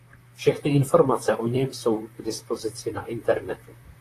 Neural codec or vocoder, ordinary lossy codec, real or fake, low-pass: vocoder, 44.1 kHz, 128 mel bands, Pupu-Vocoder; AAC, 48 kbps; fake; 14.4 kHz